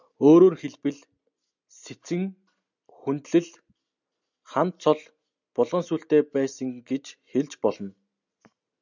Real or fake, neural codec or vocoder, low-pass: real; none; 7.2 kHz